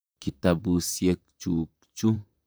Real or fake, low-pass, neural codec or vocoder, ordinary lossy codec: fake; none; vocoder, 44.1 kHz, 128 mel bands, Pupu-Vocoder; none